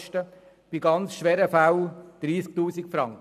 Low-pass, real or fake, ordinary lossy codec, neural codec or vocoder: 14.4 kHz; real; none; none